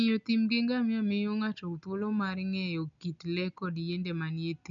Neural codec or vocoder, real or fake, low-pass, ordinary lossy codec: none; real; 7.2 kHz; none